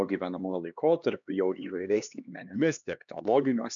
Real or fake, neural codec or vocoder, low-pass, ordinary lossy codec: fake; codec, 16 kHz, 2 kbps, X-Codec, HuBERT features, trained on LibriSpeech; 7.2 kHz; MP3, 96 kbps